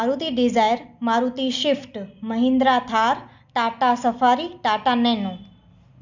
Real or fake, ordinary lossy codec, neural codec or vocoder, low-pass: real; none; none; 7.2 kHz